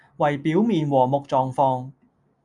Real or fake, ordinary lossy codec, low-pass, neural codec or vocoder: real; MP3, 96 kbps; 10.8 kHz; none